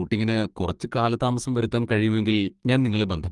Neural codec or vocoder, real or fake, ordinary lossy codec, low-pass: codec, 44.1 kHz, 2.6 kbps, SNAC; fake; Opus, 32 kbps; 10.8 kHz